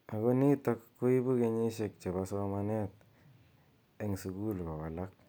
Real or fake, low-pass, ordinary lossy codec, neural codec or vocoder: real; none; none; none